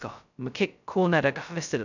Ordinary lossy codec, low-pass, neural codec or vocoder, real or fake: none; 7.2 kHz; codec, 16 kHz, 0.2 kbps, FocalCodec; fake